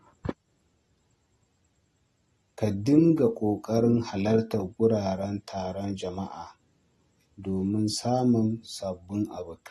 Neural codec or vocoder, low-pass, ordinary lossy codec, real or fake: none; 19.8 kHz; AAC, 32 kbps; real